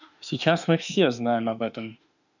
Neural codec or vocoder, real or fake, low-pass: autoencoder, 48 kHz, 32 numbers a frame, DAC-VAE, trained on Japanese speech; fake; 7.2 kHz